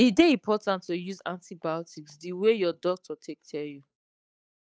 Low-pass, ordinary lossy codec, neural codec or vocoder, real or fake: none; none; codec, 16 kHz, 8 kbps, FunCodec, trained on Chinese and English, 25 frames a second; fake